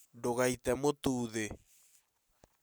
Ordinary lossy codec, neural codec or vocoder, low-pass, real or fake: none; none; none; real